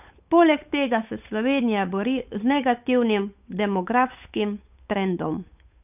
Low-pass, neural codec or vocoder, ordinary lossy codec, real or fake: 3.6 kHz; codec, 16 kHz, 8 kbps, FunCodec, trained on Chinese and English, 25 frames a second; none; fake